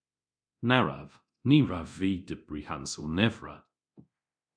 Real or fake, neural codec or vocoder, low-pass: fake; codec, 24 kHz, 0.9 kbps, DualCodec; 9.9 kHz